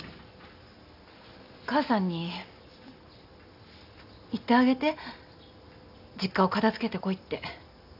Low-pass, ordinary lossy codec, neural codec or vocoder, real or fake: 5.4 kHz; none; none; real